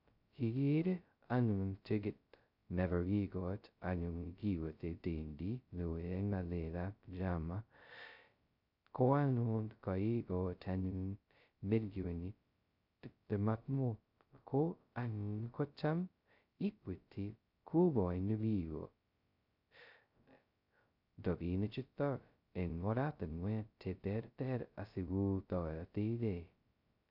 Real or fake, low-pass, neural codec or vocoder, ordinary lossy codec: fake; 5.4 kHz; codec, 16 kHz, 0.2 kbps, FocalCodec; none